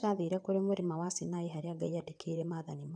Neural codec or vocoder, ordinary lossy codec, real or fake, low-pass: vocoder, 22.05 kHz, 80 mel bands, WaveNeXt; none; fake; 9.9 kHz